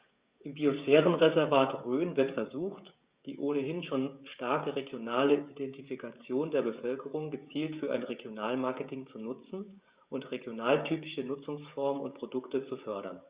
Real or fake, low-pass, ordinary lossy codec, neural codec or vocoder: fake; 3.6 kHz; Opus, 64 kbps; codec, 16 kHz, 16 kbps, FreqCodec, smaller model